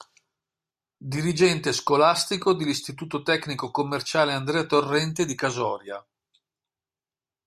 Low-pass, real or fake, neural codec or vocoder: 14.4 kHz; real; none